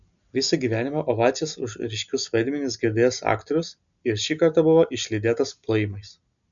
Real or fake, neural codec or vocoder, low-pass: real; none; 7.2 kHz